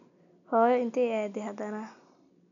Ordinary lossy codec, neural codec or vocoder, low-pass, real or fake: MP3, 96 kbps; none; 7.2 kHz; real